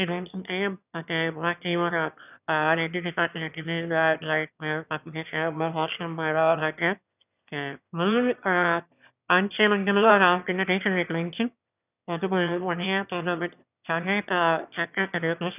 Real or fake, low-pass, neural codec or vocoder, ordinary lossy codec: fake; 3.6 kHz; autoencoder, 22.05 kHz, a latent of 192 numbers a frame, VITS, trained on one speaker; none